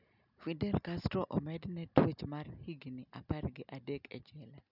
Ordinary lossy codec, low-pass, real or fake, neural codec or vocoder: none; 5.4 kHz; real; none